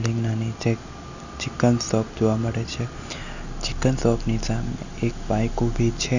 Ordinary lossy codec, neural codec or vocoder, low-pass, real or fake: none; none; 7.2 kHz; real